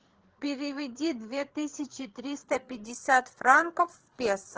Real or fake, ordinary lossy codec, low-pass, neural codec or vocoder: fake; Opus, 16 kbps; 7.2 kHz; codec, 16 kHz, 4 kbps, FreqCodec, larger model